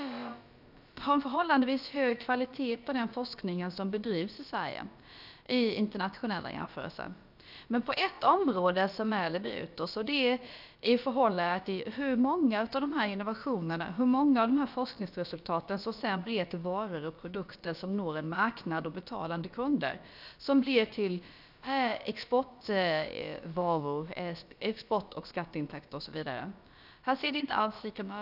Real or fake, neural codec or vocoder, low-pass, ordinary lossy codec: fake; codec, 16 kHz, about 1 kbps, DyCAST, with the encoder's durations; 5.4 kHz; none